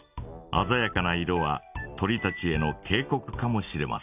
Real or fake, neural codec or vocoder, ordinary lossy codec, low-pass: real; none; none; 3.6 kHz